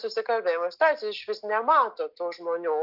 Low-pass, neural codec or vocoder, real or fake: 5.4 kHz; none; real